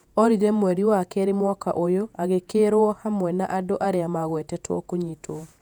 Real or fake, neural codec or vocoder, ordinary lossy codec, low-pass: fake; vocoder, 48 kHz, 128 mel bands, Vocos; none; 19.8 kHz